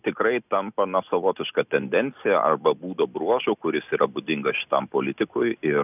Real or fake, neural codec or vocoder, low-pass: real; none; 3.6 kHz